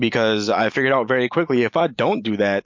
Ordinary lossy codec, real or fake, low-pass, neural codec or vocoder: MP3, 48 kbps; real; 7.2 kHz; none